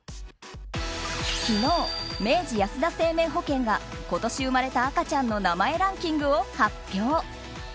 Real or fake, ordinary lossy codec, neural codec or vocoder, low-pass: real; none; none; none